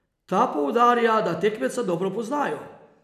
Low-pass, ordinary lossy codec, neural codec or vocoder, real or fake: 14.4 kHz; none; none; real